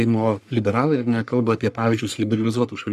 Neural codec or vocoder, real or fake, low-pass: codec, 44.1 kHz, 3.4 kbps, Pupu-Codec; fake; 14.4 kHz